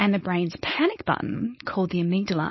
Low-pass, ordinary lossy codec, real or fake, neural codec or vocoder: 7.2 kHz; MP3, 24 kbps; fake; codec, 16 kHz, 4.8 kbps, FACodec